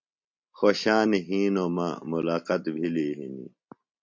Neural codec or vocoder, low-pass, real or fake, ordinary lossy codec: none; 7.2 kHz; real; MP3, 64 kbps